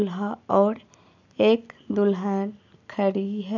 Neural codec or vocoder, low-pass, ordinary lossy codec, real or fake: none; 7.2 kHz; none; real